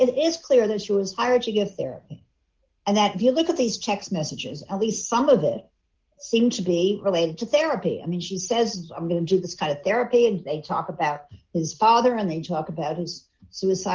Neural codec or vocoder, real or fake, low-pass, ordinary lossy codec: none; real; 7.2 kHz; Opus, 16 kbps